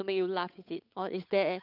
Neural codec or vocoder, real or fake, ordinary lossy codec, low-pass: codec, 16 kHz, 8 kbps, FunCodec, trained on Chinese and English, 25 frames a second; fake; none; 5.4 kHz